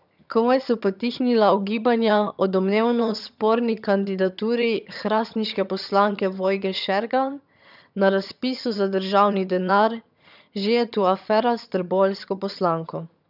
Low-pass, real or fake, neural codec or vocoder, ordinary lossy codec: 5.4 kHz; fake; vocoder, 22.05 kHz, 80 mel bands, HiFi-GAN; none